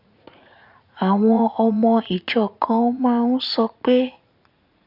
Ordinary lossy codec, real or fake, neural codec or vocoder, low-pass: none; fake; vocoder, 44.1 kHz, 80 mel bands, Vocos; 5.4 kHz